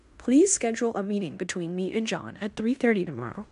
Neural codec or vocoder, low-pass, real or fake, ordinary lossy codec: codec, 16 kHz in and 24 kHz out, 0.9 kbps, LongCat-Audio-Codec, fine tuned four codebook decoder; 10.8 kHz; fake; MP3, 96 kbps